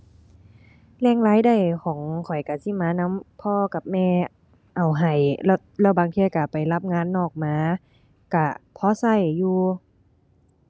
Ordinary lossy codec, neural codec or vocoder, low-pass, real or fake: none; none; none; real